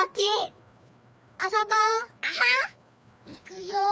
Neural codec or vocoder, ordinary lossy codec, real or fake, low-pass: codec, 16 kHz, 2 kbps, FreqCodec, larger model; none; fake; none